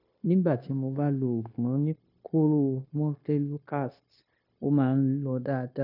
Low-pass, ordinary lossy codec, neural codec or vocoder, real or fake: 5.4 kHz; none; codec, 16 kHz, 0.9 kbps, LongCat-Audio-Codec; fake